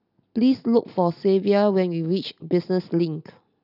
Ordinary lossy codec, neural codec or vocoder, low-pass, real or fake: none; none; 5.4 kHz; real